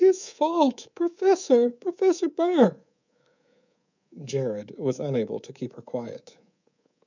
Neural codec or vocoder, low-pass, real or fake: codec, 24 kHz, 3.1 kbps, DualCodec; 7.2 kHz; fake